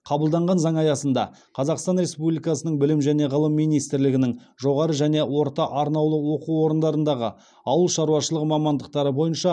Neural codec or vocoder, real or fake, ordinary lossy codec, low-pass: none; real; none; 9.9 kHz